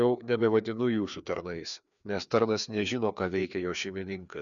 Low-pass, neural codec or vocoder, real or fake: 7.2 kHz; codec, 16 kHz, 2 kbps, FreqCodec, larger model; fake